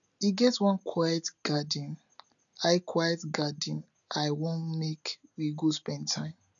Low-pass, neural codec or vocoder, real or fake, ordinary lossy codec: 7.2 kHz; none; real; none